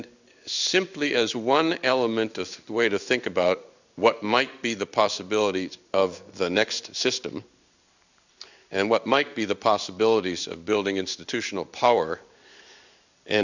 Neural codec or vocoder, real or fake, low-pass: codec, 16 kHz in and 24 kHz out, 1 kbps, XY-Tokenizer; fake; 7.2 kHz